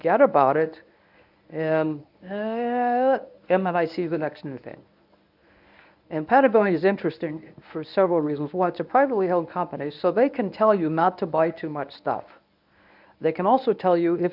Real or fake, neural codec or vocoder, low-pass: fake; codec, 24 kHz, 0.9 kbps, WavTokenizer, medium speech release version 1; 5.4 kHz